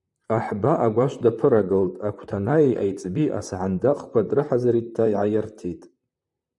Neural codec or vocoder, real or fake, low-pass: vocoder, 44.1 kHz, 128 mel bands, Pupu-Vocoder; fake; 10.8 kHz